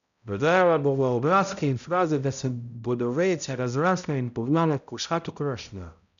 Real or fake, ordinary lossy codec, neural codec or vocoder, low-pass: fake; none; codec, 16 kHz, 0.5 kbps, X-Codec, HuBERT features, trained on balanced general audio; 7.2 kHz